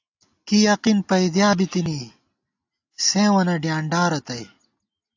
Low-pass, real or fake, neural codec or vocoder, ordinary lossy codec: 7.2 kHz; real; none; AAC, 48 kbps